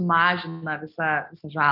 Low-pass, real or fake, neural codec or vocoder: 5.4 kHz; real; none